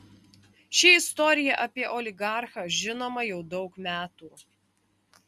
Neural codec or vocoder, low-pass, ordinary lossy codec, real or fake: none; 14.4 kHz; Opus, 64 kbps; real